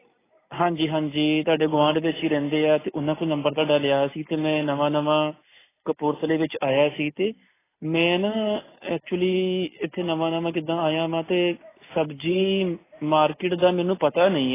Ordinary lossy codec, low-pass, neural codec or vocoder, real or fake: AAC, 16 kbps; 3.6 kHz; none; real